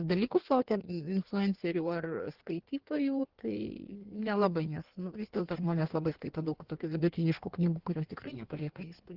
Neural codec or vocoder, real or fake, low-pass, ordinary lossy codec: codec, 16 kHz in and 24 kHz out, 1.1 kbps, FireRedTTS-2 codec; fake; 5.4 kHz; Opus, 16 kbps